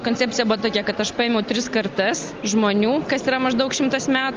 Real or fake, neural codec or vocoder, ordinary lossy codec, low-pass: real; none; Opus, 32 kbps; 7.2 kHz